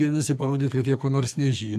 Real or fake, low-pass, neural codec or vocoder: fake; 14.4 kHz; codec, 44.1 kHz, 2.6 kbps, SNAC